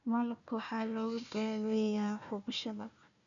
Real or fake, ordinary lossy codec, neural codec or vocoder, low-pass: fake; none; codec, 16 kHz, 1 kbps, FunCodec, trained on Chinese and English, 50 frames a second; 7.2 kHz